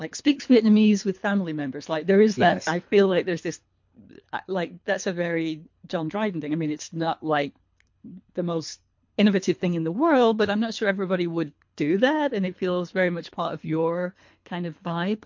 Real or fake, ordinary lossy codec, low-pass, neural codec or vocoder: fake; MP3, 48 kbps; 7.2 kHz; codec, 24 kHz, 3 kbps, HILCodec